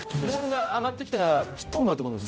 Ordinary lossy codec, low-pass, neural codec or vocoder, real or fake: none; none; codec, 16 kHz, 0.5 kbps, X-Codec, HuBERT features, trained on general audio; fake